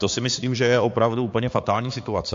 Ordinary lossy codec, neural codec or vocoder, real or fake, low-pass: AAC, 48 kbps; codec, 16 kHz, 4 kbps, X-Codec, HuBERT features, trained on balanced general audio; fake; 7.2 kHz